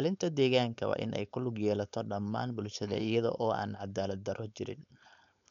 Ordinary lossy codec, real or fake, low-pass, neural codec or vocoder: MP3, 96 kbps; fake; 7.2 kHz; codec, 16 kHz, 4.8 kbps, FACodec